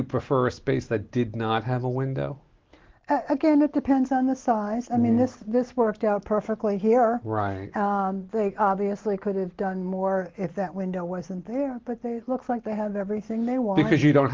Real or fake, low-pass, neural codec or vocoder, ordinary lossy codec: real; 7.2 kHz; none; Opus, 16 kbps